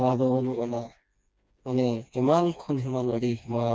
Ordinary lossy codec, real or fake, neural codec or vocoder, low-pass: none; fake; codec, 16 kHz, 2 kbps, FreqCodec, smaller model; none